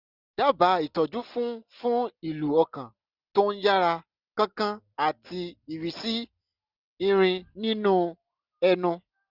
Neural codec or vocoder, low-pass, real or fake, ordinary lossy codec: none; 5.4 kHz; real; none